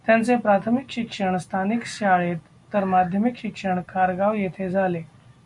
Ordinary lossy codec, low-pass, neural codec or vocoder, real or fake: MP3, 64 kbps; 10.8 kHz; none; real